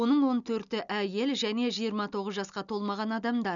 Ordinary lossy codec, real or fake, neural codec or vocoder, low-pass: none; real; none; 7.2 kHz